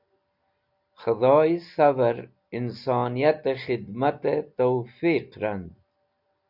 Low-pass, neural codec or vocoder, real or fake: 5.4 kHz; none; real